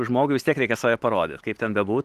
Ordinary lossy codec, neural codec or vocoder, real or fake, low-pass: Opus, 24 kbps; none; real; 14.4 kHz